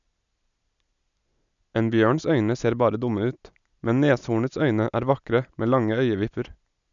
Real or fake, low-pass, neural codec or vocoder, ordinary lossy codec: real; 7.2 kHz; none; none